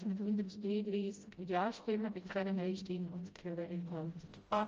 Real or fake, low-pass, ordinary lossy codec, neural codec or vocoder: fake; 7.2 kHz; Opus, 24 kbps; codec, 16 kHz, 0.5 kbps, FreqCodec, smaller model